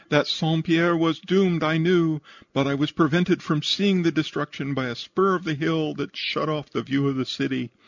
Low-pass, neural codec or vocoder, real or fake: 7.2 kHz; none; real